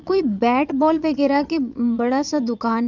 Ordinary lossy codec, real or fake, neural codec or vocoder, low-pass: none; fake; vocoder, 22.05 kHz, 80 mel bands, Vocos; 7.2 kHz